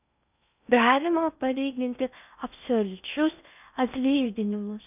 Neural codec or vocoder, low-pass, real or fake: codec, 16 kHz in and 24 kHz out, 0.6 kbps, FocalCodec, streaming, 4096 codes; 3.6 kHz; fake